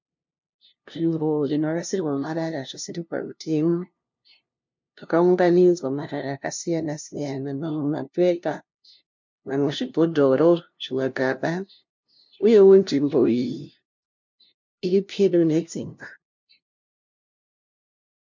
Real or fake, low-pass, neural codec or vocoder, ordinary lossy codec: fake; 7.2 kHz; codec, 16 kHz, 0.5 kbps, FunCodec, trained on LibriTTS, 25 frames a second; MP3, 48 kbps